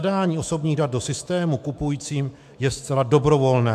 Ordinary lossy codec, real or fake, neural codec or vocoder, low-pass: MP3, 96 kbps; fake; autoencoder, 48 kHz, 128 numbers a frame, DAC-VAE, trained on Japanese speech; 14.4 kHz